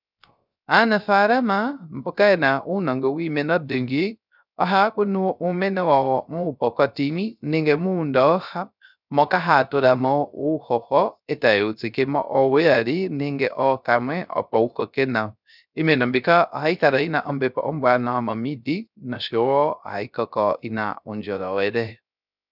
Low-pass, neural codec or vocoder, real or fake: 5.4 kHz; codec, 16 kHz, 0.3 kbps, FocalCodec; fake